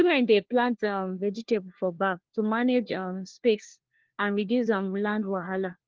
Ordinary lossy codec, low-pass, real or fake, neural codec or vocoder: Opus, 16 kbps; 7.2 kHz; fake; codec, 16 kHz, 1 kbps, FunCodec, trained on LibriTTS, 50 frames a second